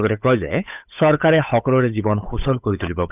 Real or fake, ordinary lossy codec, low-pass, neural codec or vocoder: fake; none; 3.6 kHz; codec, 16 kHz, 8 kbps, FunCodec, trained on Chinese and English, 25 frames a second